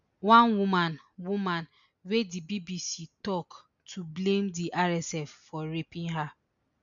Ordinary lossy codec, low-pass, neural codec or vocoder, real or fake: none; 7.2 kHz; none; real